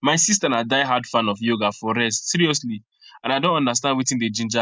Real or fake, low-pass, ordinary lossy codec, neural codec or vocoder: real; none; none; none